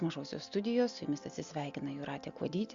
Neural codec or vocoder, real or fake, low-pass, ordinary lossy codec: none; real; 7.2 kHz; Opus, 64 kbps